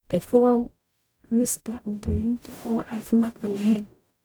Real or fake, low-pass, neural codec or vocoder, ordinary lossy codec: fake; none; codec, 44.1 kHz, 0.9 kbps, DAC; none